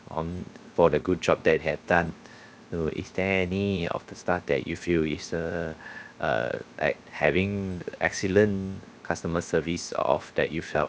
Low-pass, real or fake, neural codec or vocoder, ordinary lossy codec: none; fake; codec, 16 kHz, 0.7 kbps, FocalCodec; none